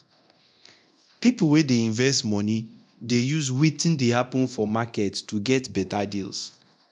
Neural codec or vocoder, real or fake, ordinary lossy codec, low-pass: codec, 24 kHz, 0.9 kbps, DualCodec; fake; none; 10.8 kHz